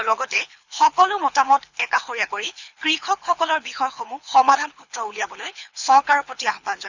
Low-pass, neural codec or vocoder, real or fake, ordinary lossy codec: 7.2 kHz; codec, 24 kHz, 6 kbps, HILCodec; fake; Opus, 64 kbps